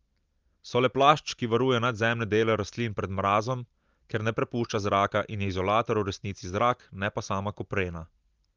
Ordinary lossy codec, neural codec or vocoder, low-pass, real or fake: Opus, 32 kbps; none; 7.2 kHz; real